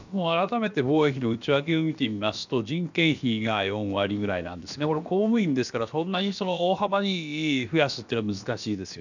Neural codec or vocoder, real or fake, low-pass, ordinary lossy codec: codec, 16 kHz, about 1 kbps, DyCAST, with the encoder's durations; fake; 7.2 kHz; none